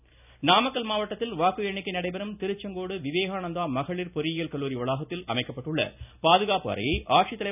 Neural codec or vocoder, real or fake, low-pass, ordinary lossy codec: none; real; 3.6 kHz; none